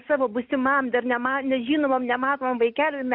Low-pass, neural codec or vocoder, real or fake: 5.4 kHz; none; real